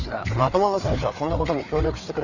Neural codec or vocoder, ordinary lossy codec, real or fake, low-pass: codec, 16 kHz, 4 kbps, FreqCodec, larger model; none; fake; 7.2 kHz